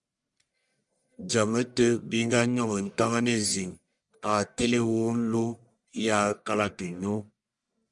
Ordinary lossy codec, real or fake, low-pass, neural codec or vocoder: MP3, 96 kbps; fake; 10.8 kHz; codec, 44.1 kHz, 1.7 kbps, Pupu-Codec